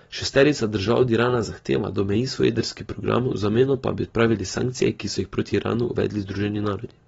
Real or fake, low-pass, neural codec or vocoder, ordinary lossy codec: real; 19.8 kHz; none; AAC, 24 kbps